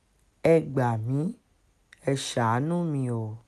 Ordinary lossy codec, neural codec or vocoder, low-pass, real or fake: none; none; 14.4 kHz; real